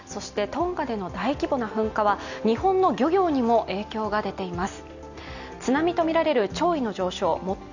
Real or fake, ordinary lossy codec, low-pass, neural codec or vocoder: real; none; 7.2 kHz; none